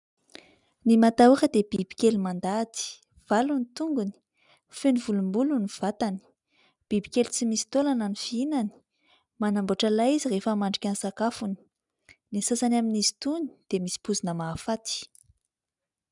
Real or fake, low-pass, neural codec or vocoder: real; 10.8 kHz; none